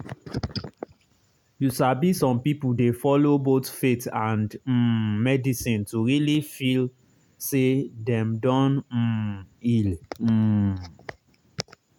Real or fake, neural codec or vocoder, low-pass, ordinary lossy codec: real; none; 19.8 kHz; none